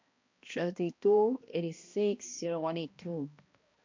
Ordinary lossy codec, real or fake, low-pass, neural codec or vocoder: MP3, 64 kbps; fake; 7.2 kHz; codec, 16 kHz, 1 kbps, X-Codec, HuBERT features, trained on balanced general audio